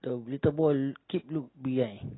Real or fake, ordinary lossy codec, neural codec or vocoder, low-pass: real; AAC, 16 kbps; none; 7.2 kHz